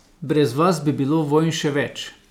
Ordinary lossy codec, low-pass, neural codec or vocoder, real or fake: none; 19.8 kHz; none; real